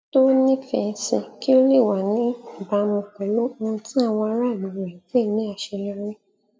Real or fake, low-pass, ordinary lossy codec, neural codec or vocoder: real; none; none; none